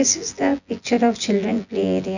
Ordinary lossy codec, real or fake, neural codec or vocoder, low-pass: none; fake; vocoder, 24 kHz, 100 mel bands, Vocos; 7.2 kHz